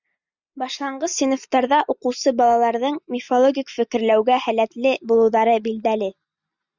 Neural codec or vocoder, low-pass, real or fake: none; 7.2 kHz; real